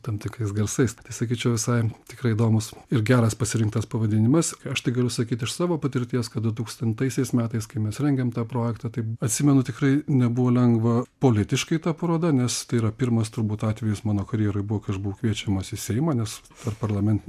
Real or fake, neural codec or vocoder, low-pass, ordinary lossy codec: real; none; 14.4 kHz; MP3, 96 kbps